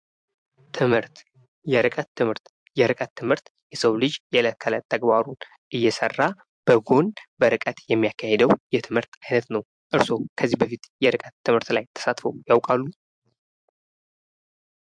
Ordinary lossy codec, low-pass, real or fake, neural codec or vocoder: MP3, 64 kbps; 9.9 kHz; fake; vocoder, 44.1 kHz, 128 mel bands every 256 samples, BigVGAN v2